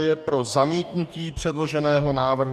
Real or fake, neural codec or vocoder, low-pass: fake; codec, 44.1 kHz, 2.6 kbps, DAC; 14.4 kHz